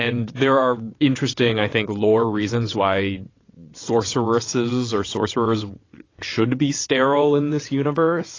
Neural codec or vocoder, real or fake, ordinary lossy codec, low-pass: vocoder, 22.05 kHz, 80 mel bands, WaveNeXt; fake; AAC, 32 kbps; 7.2 kHz